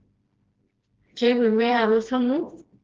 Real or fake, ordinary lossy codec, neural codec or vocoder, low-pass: fake; Opus, 16 kbps; codec, 16 kHz, 1 kbps, FreqCodec, smaller model; 7.2 kHz